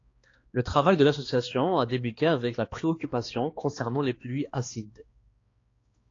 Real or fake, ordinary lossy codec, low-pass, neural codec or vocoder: fake; AAC, 32 kbps; 7.2 kHz; codec, 16 kHz, 2 kbps, X-Codec, HuBERT features, trained on balanced general audio